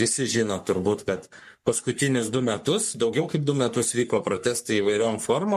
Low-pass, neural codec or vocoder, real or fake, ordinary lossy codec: 14.4 kHz; codec, 44.1 kHz, 3.4 kbps, Pupu-Codec; fake; MP3, 64 kbps